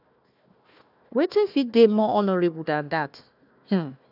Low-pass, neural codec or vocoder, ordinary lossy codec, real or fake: 5.4 kHz; codec, 16 kHz, 1 kbps, FunCodec, trained on Chinese and English, 50 frames a second; none; fake